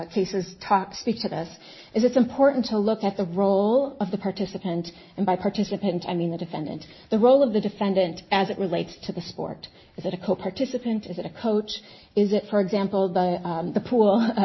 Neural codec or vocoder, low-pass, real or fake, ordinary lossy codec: none; 7.2 kHz; real; MP3, 24 kbps